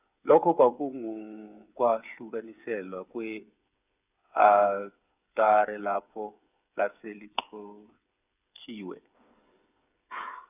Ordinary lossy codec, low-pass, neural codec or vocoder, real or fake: none; 3.6 kHz; codec, 16 kHz, 8 kbps, FreqCodec, smaller model; fake